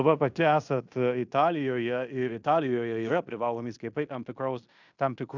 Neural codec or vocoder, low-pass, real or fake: codec, 16 kHz in and 24 kHz out, 0.9 kbps, LongCat-Audio-Codec, fine tuned four codebook decoder; 7.2 kHz; fake